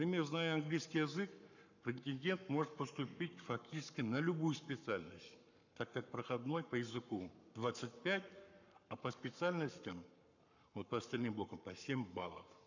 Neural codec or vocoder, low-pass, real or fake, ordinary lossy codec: codec, 44.1 kHz, 7.8 kbps, Pupu-Codec; 7.2 kHz; fake; none